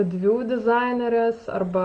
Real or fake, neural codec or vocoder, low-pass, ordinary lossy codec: real; none; 9.9 kHz; Opus, 24 kbps